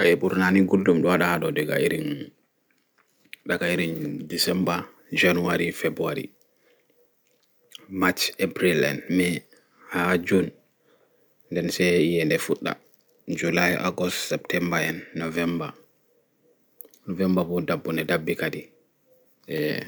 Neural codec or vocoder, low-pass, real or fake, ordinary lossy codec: none; none; real; none